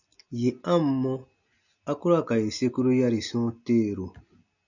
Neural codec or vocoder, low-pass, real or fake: none; 7.2 kHz; real